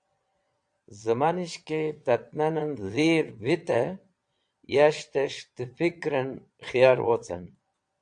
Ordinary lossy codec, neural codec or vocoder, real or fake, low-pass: AAC, 64 kbps; vocoder, 22.05 kHz, 80 mel bands, Vocos; fake; 9.9 kHz